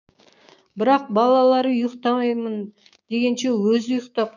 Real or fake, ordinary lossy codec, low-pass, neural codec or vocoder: fake; none; 7.2 kHz; codec, 16 kHz, 6 kbps, DAC